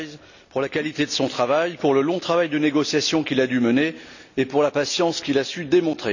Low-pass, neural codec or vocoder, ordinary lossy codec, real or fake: 7.2 kHz; none; none; real